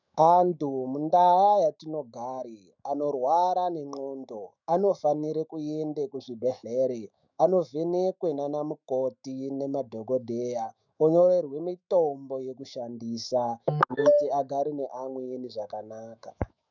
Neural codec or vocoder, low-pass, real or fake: autoencoder, 48 kHz, 128 numbers a frame, DAC-VAE, trained on Japanese speech; 7.2 kHz; fake